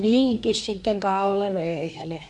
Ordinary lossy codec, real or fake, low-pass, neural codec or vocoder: none; fake; 10.8 kHz; codec, 24 kHz, 1 kbps, SNAC